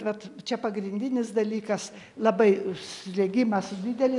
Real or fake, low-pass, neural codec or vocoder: real; 10.8 kHz; none